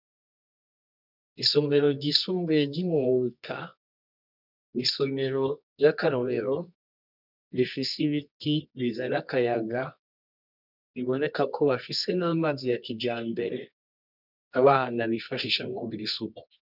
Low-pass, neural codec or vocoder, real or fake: 5.4 kHz; codec, 24 kHz, 0.9 kbps, WavTokenizer, medium music audio release; fake